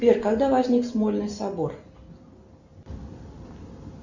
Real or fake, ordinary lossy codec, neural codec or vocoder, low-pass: real; Opus, 64 kbps; none; 7.2 kHz